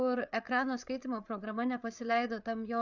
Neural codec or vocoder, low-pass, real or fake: codec, 16 kHz, 8 kbps, FreqCodec, larger model; 7.2 kHz; fake